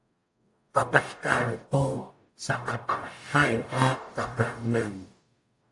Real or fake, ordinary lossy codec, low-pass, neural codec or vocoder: fake; AAC, 64 kbps; 10.8 kHz; codec, 44.1 kHz, 0.9 kbps, DAC